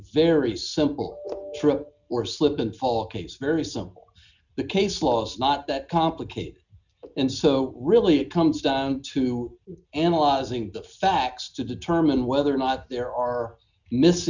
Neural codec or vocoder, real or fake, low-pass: none; real; 7.2 kHz